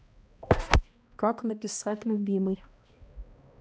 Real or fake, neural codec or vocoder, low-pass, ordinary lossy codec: fake; codec, 16 kHz, 1 kbps, X-Codec, HuBERT features, trained on balanced general audio; none; none